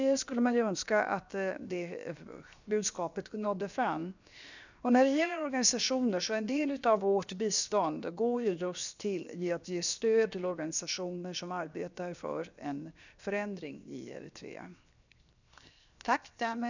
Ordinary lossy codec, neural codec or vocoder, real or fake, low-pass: none; codec, 16 kHz, 0.7 kbps, FocalCodec; fake; 7.2 kHz